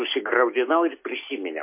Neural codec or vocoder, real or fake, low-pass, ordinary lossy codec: none; real; 3.6 kHz; MP3, 24 kbps